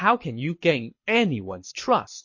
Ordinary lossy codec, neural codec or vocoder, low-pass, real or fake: MP3, 32 kbps; codec, 24 kHz, 6 kbps, HILCodec; 7.2 kHz; fake